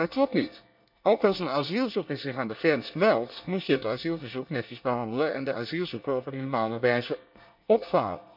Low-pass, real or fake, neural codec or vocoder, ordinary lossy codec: 5.4 kHz; fake; codec, 24 kHz, 1 kbps, SNAC; none